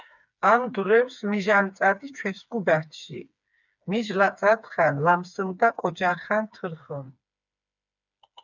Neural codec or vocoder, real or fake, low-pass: codec, 16 kHz, 4 kbps, FreqCodec, smaller model; fake; 7.2 kHz